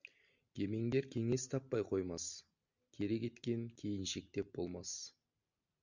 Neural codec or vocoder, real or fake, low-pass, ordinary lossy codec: none; real; 7.2 kHz; Opus, 64 kbps